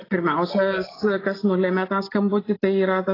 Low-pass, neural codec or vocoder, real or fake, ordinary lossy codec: 5.4 kHz; none; real; AAC, 24 kbps